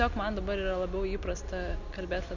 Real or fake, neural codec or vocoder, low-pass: real; none; 7.2 kHz